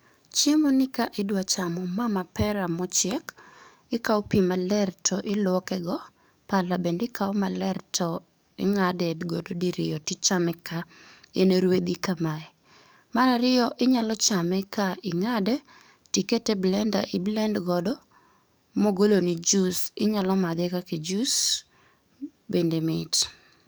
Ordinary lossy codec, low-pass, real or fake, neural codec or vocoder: none; none; fake; codec, 44.1 kHz, 7.8 kbps, DAC